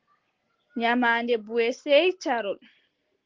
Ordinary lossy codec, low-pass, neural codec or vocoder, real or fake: Opus, 16 kbps; 7.2 kHz; none; real